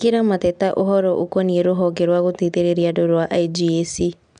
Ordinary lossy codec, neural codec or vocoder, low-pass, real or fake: none; none; 9.9 kHz; real